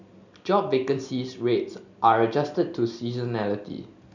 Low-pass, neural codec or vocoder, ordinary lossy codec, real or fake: 7.2 kHz; none; none; real